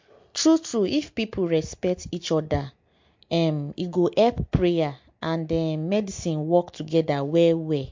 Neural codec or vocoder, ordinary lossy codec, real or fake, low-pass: none; MP3, 48 kbps; real; 7.2 kHz